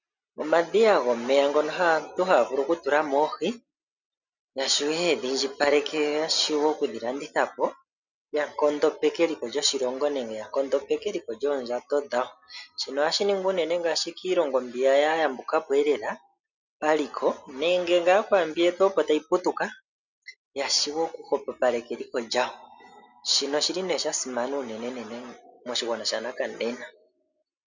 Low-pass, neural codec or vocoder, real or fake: 7.2 kHz; none; real